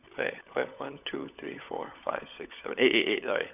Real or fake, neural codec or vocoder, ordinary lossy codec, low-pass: fake; codec, 16 kHz, 16 kbps, FunCodec, trained on LibriTTS, 50 frames a second; none; 3.6 kHz